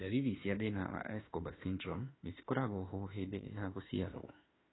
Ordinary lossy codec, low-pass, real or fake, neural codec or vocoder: AAC, 16 kbps; 7.2 kHz; fake; autoencoder, 48 kHz, 32 numbers a frame, DAC-VAE, trained on Japanese speech